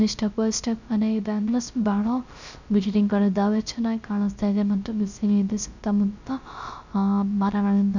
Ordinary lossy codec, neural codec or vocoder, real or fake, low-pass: none; codec, 16 kHz, 0.3 kbps, FocalCodec; fake; 7.2 kHz